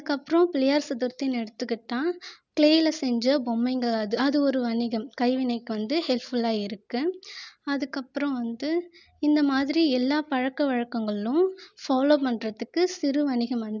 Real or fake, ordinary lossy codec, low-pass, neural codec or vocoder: real; none; 7.2 kHz; none